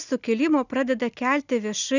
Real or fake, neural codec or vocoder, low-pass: real; none; 7.2 kHz